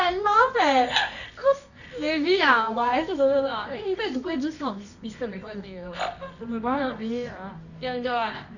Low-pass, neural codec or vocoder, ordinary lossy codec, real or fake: 7.2 kHz; codec, 24 kHz, 0.9 kbps, WavTokenizer, medium music audio release; none; fake